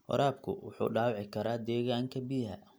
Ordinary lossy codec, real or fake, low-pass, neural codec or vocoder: none; real; none; none